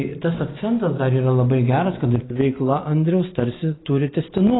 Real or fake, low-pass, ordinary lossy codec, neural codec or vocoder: real; 7.2 kHz; AAC, 16 kbps; none